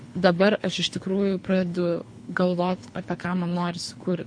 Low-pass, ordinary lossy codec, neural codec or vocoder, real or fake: 9.9 kHz; MP3, 48 kbps; codec, 24 kHz, 3 kbps, HILCodec; fake